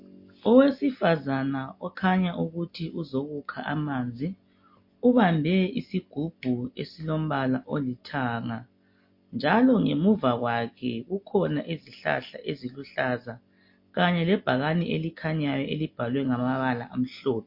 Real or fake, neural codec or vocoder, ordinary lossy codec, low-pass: real; none; MP3, 32 kbps; 5.4 kHz